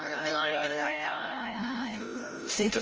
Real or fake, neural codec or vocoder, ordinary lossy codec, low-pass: fake; codec, 16 kHz, 0.5 kbps, FreqCodec, larger model; Opus, 24 kbps; 7.2 kHz